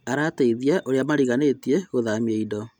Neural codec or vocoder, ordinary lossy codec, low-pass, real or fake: none; none; 19.8 kHz; real